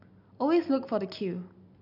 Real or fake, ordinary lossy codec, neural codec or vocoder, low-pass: real; none; none; 5.4 kHz